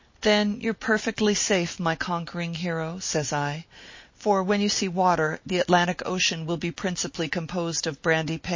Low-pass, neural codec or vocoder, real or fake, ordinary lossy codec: 7.2 kHz; none; real; MP3, 32 kbps